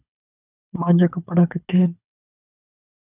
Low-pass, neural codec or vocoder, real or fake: 3.6 kHz; codec, 24 kHz, 6 kbps, HILCodec; fake